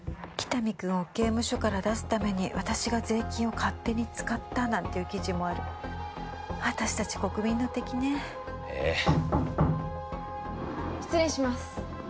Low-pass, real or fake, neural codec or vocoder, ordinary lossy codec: none; real; none; none